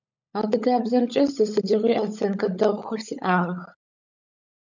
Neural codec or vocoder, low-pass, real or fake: codec, 16 kHz, 16 kbps, FunCodec, trained on LibriTTS, 50 frames a second; 7.2 kHz; fake